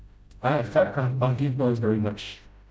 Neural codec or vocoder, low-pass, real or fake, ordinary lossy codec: codec, 16 kHz, 0.5 kbps, FreqCodec, smaller model; none; fake; none